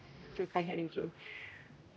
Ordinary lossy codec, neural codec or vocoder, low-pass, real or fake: none; codec, 16 kHz, 0.5 kbps, X-Codec, HuBERT features, trained on general audio; none; fake